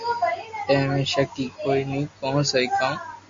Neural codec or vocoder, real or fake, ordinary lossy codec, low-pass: none; real; MP3, 48 kbps; 7.2 kHz